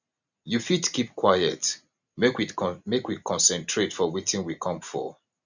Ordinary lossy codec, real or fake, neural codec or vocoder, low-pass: none; real; none; 7.2 kHz